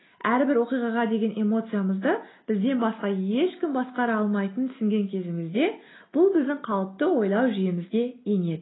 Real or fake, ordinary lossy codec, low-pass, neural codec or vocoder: real; AAC, 16 kbps; 7.2 kHz; none